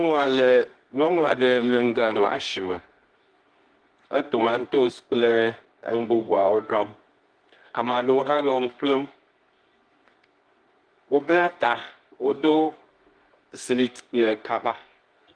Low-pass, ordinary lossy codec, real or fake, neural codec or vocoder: 9.9 kHz; Opus, 24 kbps; fake; codec, 24 kHz, 0.9 kbps, WavTokenizer, medium music audio release